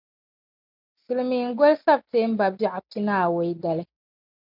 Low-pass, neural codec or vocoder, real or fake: 5.4 kHz; none; real